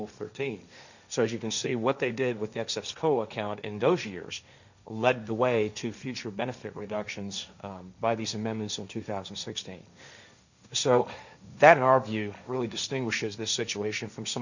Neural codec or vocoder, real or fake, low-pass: codec, 16 kHz, 1.1 kbps, Voila-Tokenizer; fake; 7.2 kHz